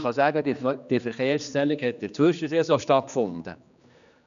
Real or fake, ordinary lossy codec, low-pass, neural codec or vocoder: fake; none; 7.2 kHz; codec, 16 kHz, 2 kbps, X-Codec, HuBERT features, trained on general audio